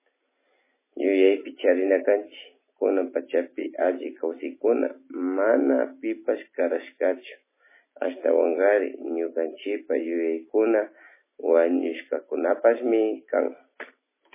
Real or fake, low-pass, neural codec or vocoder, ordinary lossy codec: real; 3.6 kHz; none; MP3, 16 kbps